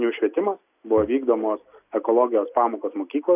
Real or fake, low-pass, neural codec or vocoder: real; 3.6 kHz; none